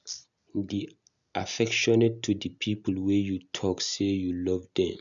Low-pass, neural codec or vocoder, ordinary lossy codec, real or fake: 7.2 kHz; none; none; real